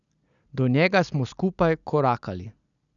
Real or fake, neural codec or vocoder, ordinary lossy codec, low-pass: real; none; none; 7.2 kHz